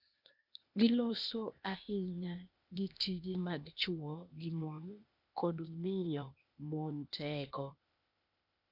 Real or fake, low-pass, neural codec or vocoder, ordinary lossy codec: fake; 5.4 kHz; codec, 16 kHz, 0.8 kbps, ZipCodec; none